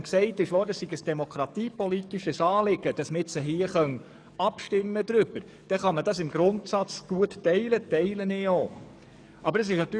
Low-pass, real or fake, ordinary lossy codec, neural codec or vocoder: 9.9 kHz; fake; none; codec, 44.1 kHz, 7.8 kbps, DAC